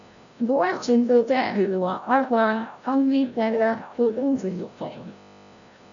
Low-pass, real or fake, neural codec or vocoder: 7.2 kHz; fake; codec, 16 kHz, 0.5 kbps, FreqCodec, larger model